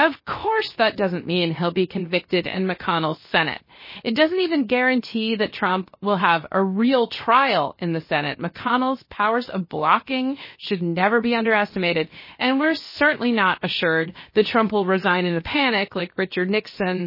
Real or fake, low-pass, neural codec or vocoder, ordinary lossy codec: fake; 5.4 kHz; codec, 16 kHz, 0.7 kbps, FocalCodec; MP3, 24 kbps